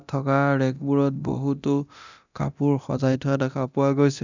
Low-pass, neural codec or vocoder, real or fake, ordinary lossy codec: 7.2 kHz; codec, 24 kHz, 0.9 kbps, DualCodec; fake; none